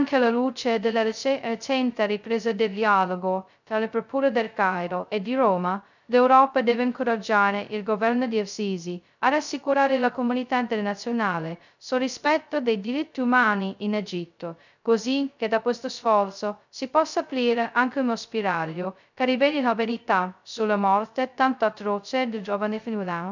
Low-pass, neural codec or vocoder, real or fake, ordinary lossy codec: 7.2 kHz; codec, 16 kHz, 0.2 kbps, FocalCodec; fake; none